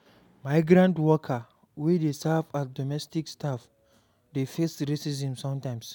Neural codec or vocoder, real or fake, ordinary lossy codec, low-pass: none; real; none; 19.8 kHz